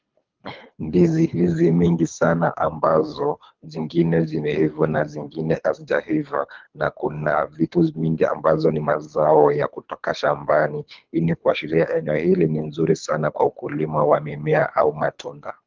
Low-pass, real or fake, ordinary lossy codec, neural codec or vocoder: 7.2 kHz; fake; Opus, 24 kbps; codec, 24 kHz, 3 kbps, HILCodec